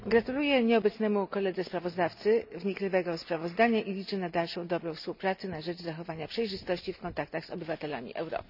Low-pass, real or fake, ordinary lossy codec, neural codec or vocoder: 5.4 kHz; real; none; none